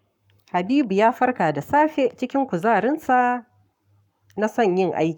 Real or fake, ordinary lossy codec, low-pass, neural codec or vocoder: fake; none; 19.8 kHz; codec, 44.1 kHz, 7.8 kbps, Pupu-Codec